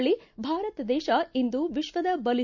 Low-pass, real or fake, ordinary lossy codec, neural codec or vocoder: 7.2 kHz; real; none; none